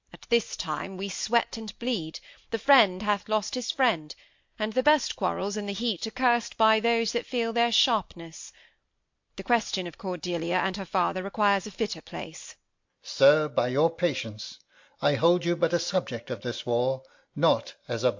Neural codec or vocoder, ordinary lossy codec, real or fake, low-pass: none; MP3, 48 kbps; real; 7.2 kHz